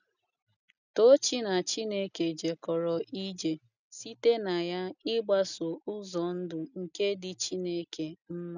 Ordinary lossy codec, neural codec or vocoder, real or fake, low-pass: none; none; real; 7.2 kHz